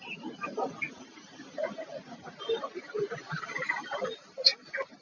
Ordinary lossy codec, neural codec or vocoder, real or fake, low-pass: MP3, 48 kbps; none; real; 7.2 kHz